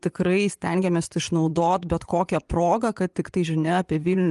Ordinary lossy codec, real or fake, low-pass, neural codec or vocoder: Opus, 32 kbps; fake; 10.8 kHz; vocoder, 24 kHz, 100 mel bands, Vocos